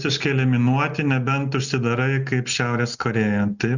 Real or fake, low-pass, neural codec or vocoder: real; 7.2 kHz; none